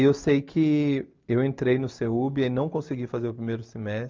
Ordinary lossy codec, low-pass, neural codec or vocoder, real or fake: Opus, 32 kbps; 7.2 kHz; none; real